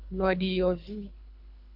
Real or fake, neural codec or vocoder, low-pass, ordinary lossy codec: fake; codec, 24 kHz, 3 kbps, HILCodec; 5.4 kHz; AAC, 48 kbps